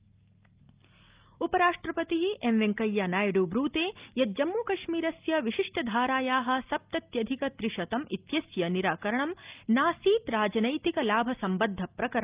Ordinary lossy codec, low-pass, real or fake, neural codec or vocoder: Opus, 24 kbps; 3.6 kHz; real; none